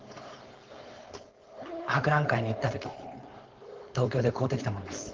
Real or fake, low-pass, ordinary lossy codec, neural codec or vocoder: fake; 7.2 kHz; Opus, 16 kbps; codec, 16 kHz, 4.8 kbps, FACodec